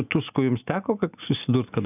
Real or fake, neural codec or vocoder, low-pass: real; none; 3.6 kHz